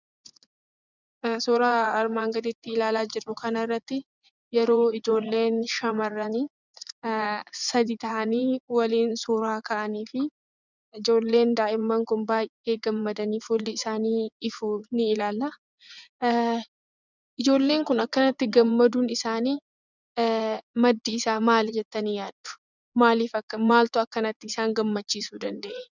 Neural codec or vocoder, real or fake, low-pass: vocoder, 24 kHz, 100 mel bands, Vocos; fake; 7.2 kHz